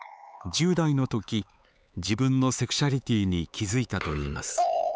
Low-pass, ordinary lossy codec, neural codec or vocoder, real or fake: none; none; codec, 16 kHz, 4 kbps, X-Codec, HuBERT features, trained on LibriSpeech; fake